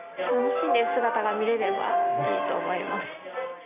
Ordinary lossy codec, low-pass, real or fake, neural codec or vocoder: none; 3.6 kHz; real; none